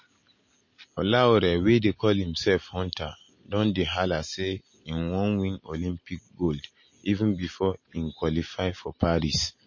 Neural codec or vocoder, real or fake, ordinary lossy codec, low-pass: none; real; MP3, 32 kbps; 7.2 kHz